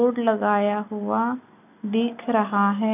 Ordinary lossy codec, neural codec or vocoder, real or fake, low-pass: none; none; real; 3.6 kHz